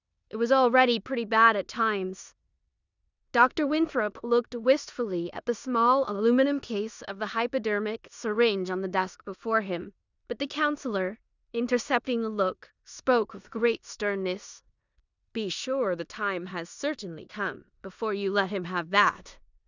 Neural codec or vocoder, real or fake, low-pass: codec, 16 kHz in and 24 kHz out, 0.9 kbps, LongCat-Audio-Codec, four codebook decoder; fake; 7.2 kHz